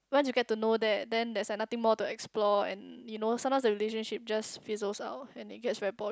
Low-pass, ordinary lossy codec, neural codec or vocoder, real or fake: none; none; none; real